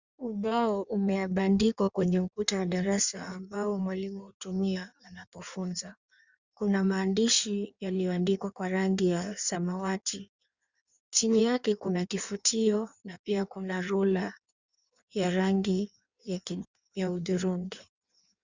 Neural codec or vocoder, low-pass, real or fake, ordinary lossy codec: codec, 16 kHz in and 24 kHz out, 1.1 kbps, FireRedTTS-2 codec; 7.2 kHz; fake; Opus, 64 kbps